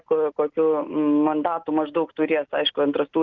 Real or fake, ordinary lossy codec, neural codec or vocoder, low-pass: real; Opus, 24 kbps; none; 7.2 kHz